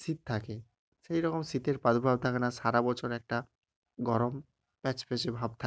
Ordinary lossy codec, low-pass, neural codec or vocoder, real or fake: none; none; none; real